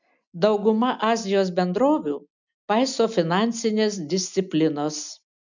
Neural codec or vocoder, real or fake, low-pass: none; real; 7.2 kHz